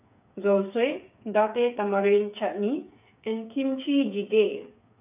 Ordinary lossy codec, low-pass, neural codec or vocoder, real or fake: none; 3.6 kHz; codec, 16 kHz, 8 kbps, FreqCodec, smaller model; fake